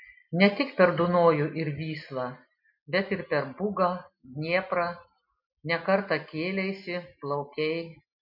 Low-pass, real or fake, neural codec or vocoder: 5.4 kHz; real; none